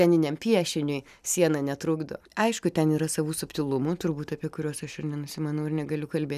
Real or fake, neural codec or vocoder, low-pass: real; none; 14.4 kHz